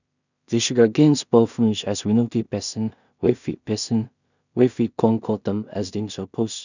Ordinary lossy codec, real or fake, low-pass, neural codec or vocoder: none; fake; 7.2 kHz; codec, 16 kHz in and 24 kHz out, 0.4 kbps, LongCat-Audio-Codec, two codebook decoder